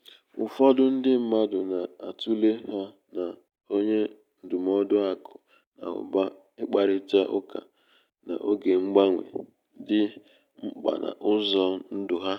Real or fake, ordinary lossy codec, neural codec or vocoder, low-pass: real; none; none; 19.8 kHz